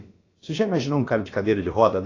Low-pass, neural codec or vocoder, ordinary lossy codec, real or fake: 7.2 kHz; codec, 16 kHz, about 1 kbps, DyCAST, with the encoder's durations; AAC, 32 kbps; fake